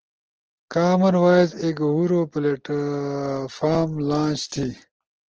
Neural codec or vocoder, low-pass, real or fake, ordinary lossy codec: none; 7.2 kHz; real; Opus, 16 kbps